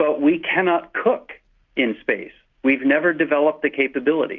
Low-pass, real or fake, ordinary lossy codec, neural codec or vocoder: 7.2 kHz; real; Opus, 64 kbps; none